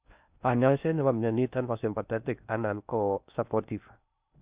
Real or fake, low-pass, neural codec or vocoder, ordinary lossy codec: fake; 3.6 kHz; codec, 16 kHz in and 24 kHz out, 0.6 kbps, FocalCodec, streaming, 2048 codes; Opus, 64 kbps